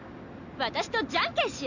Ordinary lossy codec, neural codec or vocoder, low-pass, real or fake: none; none; 7.2 kHz; real